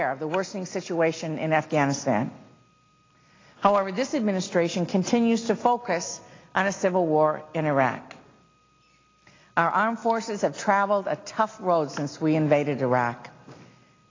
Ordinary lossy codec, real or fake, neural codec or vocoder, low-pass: AAC, 32 kbps; real; none; 7.2 kHz